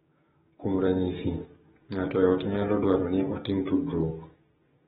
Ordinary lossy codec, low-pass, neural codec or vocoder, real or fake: AAC, 16 kbps; 7.2 kHz; codec, 16 kHz, 6 kbps, DAC; fake